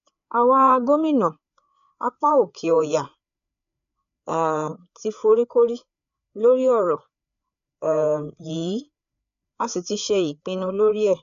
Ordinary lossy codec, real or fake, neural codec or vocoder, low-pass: none; fake; codec, 16 kHz, 4 kbps, FreqCodec, larger model; 7.2 kHz